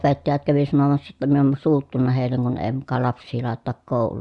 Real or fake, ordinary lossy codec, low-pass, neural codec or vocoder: real; Opus, 64 kbps; 10.8 kHz; none